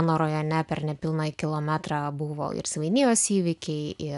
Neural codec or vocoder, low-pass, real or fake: none; 10.8 kHz; real